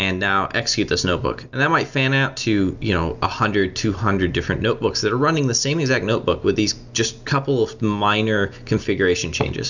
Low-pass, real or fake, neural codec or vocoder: 7.2 kHz; real; none